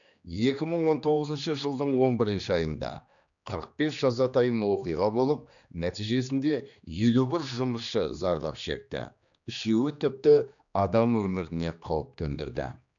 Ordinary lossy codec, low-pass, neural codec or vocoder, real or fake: none; 7.2 kHz; codec, 16 kHz, 2 kbps, X-Codec, HuBERT features, trained on general audio; fake